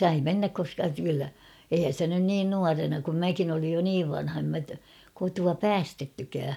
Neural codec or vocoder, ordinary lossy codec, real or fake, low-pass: none; none; real; 19.8 kHz